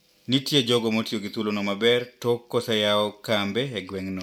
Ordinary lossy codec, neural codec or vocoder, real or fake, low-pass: none; none; real; 19.8 kHz